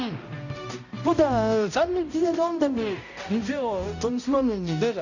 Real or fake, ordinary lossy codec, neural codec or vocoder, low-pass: fake; none; codec, 16 kHz, 0.5 kbps, X-Codec, HuBERT features, trained on balanced general audio; 7.2 kHz